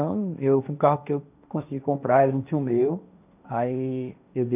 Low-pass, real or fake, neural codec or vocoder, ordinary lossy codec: 3.6 kHz; fake; codec, 16 kHz, 1.1 kbps, Voila-Tokenizer; none